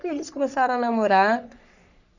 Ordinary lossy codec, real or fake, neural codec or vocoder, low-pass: none; fake; codec, 44.1 kHz, 3.4 kbps, Pupu-Codec; 7.2 kHz